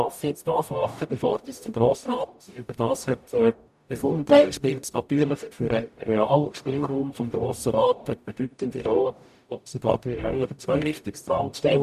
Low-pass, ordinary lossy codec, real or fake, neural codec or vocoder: 14.4 kHz; none; fake; codec, 44.1 kHz, 0.9 kbps, DAC